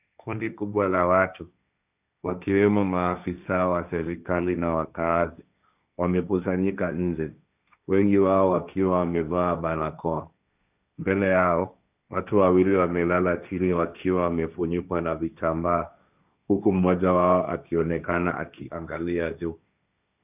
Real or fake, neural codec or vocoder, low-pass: fake; codec, 16 kHz, 1.1 kbps, Voila-Tokenizer; 3.6 kHz